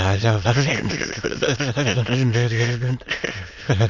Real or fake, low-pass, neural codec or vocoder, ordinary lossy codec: fake; 7.2 kHz; autoencoder, 22.05 kHz, a latent of 192 numbers a frame, VITS, trained on many speakers; none